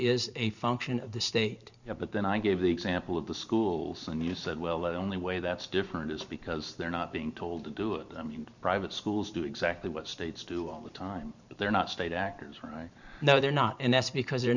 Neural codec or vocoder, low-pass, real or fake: vocoder, 44.1 kHz, 128 mel bands every 512 samples, BigVGAN v2; 7.2 kHz; fake